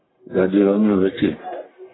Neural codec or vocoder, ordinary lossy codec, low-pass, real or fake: codec, 44.1 kHz, 1.7 kbps, Pupu-Codec; AAC, 16 kbps; 7.2 kHz; fake